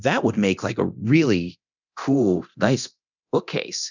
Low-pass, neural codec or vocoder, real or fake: 7.2 kHz; codec, 24 kHz, 0.9 kbps, DualCodec; fake